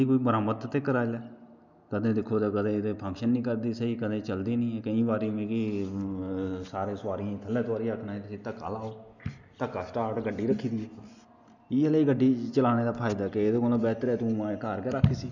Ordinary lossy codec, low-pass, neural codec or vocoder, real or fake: none; 7.2 kHz; none; real